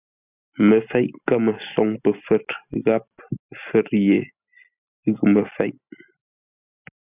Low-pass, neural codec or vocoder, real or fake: 3.6 kHz; none; real